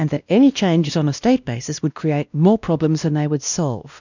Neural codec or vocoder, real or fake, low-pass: codec, 16 kHz, 1 kbps, X-Codec, WavLM features, trained on Multilingual LibriSpeech; fake; 7.2 kHz